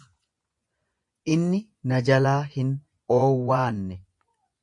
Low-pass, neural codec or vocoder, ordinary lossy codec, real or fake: 10.8 kHz; vocoder, 24 kHz, 100 mel bands, Vocos; MP3, 48 kbps; fake